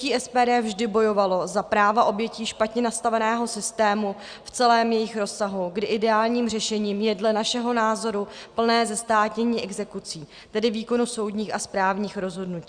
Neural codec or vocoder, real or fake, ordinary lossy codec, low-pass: none; real; Opus, 64 kbps; 9.9 kHz